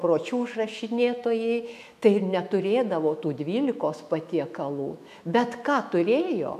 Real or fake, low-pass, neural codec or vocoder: fake; 14.4 kHz; autoencoder, 48 kHz, 128 numbers a frame, DAC-VAE, trained on Japanese speech